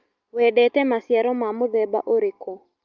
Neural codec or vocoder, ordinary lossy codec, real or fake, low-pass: none; Opus, 32 kbps; real; 7.2 kHz